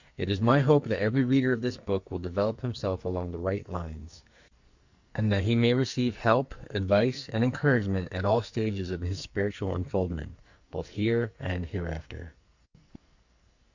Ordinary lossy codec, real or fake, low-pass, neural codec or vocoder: Opus, 64 kbps; fake; 7.2 kHz; codec, 44.1 kHz, 2.6 kbps, SNAC